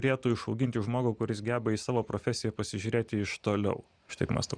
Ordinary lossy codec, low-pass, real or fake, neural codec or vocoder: Opus, 64 kbps; 9.9 kHz; real; none